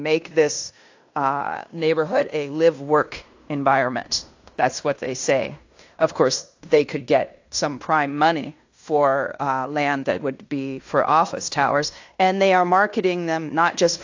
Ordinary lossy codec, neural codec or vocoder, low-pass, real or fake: AAC, 48 kbps; codec, 16 kHz in and 24 kHz out, 0.9 kbps, LongCat-Audio-Codec, fine tuned four codebook decoder; 7.2 kHz; fake